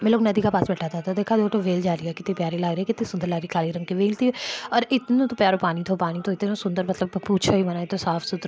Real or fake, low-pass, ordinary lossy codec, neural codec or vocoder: real; none; none; none